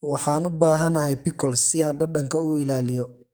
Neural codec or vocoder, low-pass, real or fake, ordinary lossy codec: codec, 44.1 kHz, 2.6 kbps, SNAC; none; fake; none